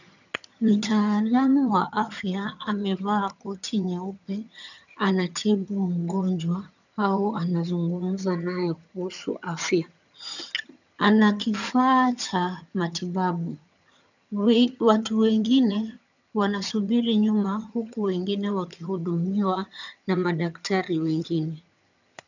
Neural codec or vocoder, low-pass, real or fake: vocoder, 22.05 kHz, 80 mel bands, HiFi-GAN; 7.2 kHz; fake